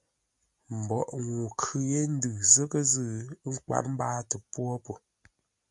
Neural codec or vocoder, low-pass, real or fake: none; 10.8 kHz; real